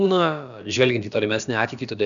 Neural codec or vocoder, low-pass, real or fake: codec, 16 kHz, about 1 kbps, DyCAST, with the encoder's durations; 7.2 kHz; fake